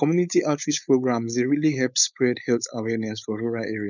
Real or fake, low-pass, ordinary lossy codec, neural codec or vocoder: fake; 7.2 kHz; none; codec, 16 kHz, 4.8 kbps, FACodec